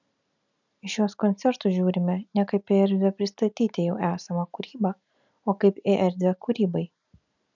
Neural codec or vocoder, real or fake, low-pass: none; real; 7.2 kHz